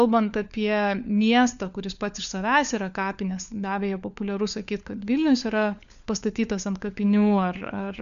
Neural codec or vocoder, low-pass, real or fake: codec, 16 kHz, 4 kbps, FunCodec, trained on LibriTTS, 50 frames a second; 7.2 kHz; fake